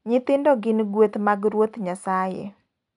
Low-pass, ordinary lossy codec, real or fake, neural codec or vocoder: 10.8 kHz; none; real; none